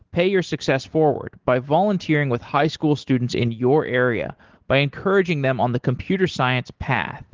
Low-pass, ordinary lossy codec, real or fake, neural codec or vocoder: 7.2 kHz; Opus, 16 kbps; real; none